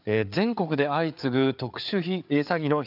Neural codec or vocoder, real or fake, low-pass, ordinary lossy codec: codec, 16 kHz, 4 kbps, FreqCodec, larger model; fake; 5.4 kHz; none